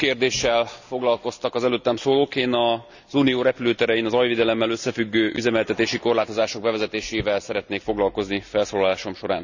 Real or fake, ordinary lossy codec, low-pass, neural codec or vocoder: real; none; 7.2 kHz; none